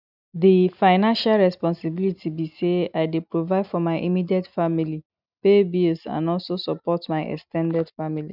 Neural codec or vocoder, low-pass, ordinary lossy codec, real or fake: none; 5.4 kHz; none; real